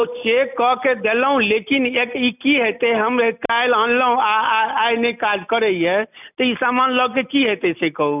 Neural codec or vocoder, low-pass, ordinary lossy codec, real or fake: none; 3.6 kHz; none; real